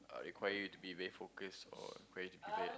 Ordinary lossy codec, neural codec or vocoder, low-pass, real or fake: none; none; none; real